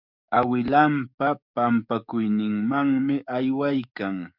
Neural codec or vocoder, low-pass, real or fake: codec, 44.1 kHz, 7.8 kbps, DAC; 5.4 kHz; fake